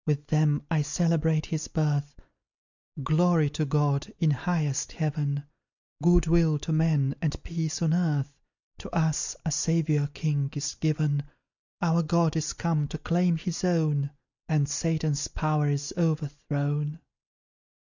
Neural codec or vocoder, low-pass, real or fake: none; 7.2 kHz; real